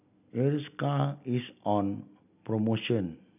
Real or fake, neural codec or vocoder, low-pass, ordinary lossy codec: real; none; 3.6 kHz; none